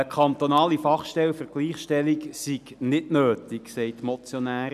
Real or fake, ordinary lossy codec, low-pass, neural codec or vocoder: real; none; 14.4 kHz; none